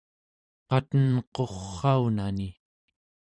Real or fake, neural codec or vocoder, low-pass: fake; vocoder, 44.1 kHz, 128 mel bands every 512 samples, BigVGAN v2; 9.9 kHz